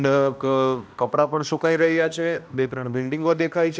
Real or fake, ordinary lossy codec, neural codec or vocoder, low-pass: fake; none; codec, 16 kHz, 1 kbps, X-Codec, HuBERT features, trained on balanced general audio; none